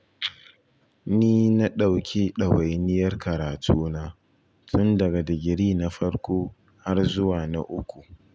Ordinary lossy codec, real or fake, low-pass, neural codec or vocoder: none; real; none; none